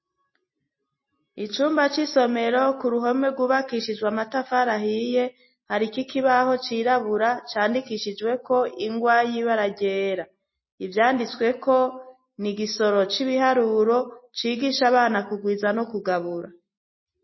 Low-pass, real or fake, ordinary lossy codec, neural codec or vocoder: 7.2 kHz; real; MP3, 24 kbps; none